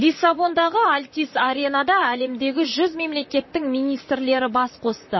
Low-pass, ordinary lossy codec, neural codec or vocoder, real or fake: 7.2 kHz; MP3, 24 kbps; vocoder, 44.1 kHz, 128 mel bands, Pupu-Vocoder; fake